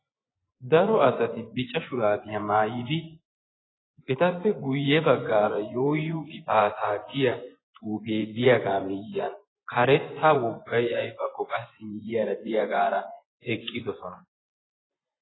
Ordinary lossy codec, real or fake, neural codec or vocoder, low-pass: AAC, 16 kbps; fake; vocoder, 24 kHz, 100 mel bands, Vocos; 7.2 kHz